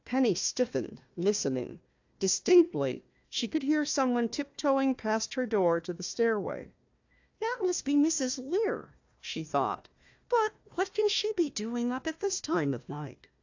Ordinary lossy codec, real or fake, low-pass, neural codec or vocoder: AAC, 48 kbps; fake; 7.2 kHz; codec, 16 kHz, 1 kbps, FunCodec, trained on Chinese and English, 50 frames a second